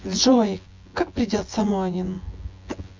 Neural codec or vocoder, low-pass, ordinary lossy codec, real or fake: vocoder, 24 kHz, 100 mel bands, Vocos; 7.2 kHz; MP3, 48 kbps; fake